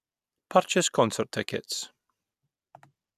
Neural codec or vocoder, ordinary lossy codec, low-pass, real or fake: none; none; 14.4 kHz; real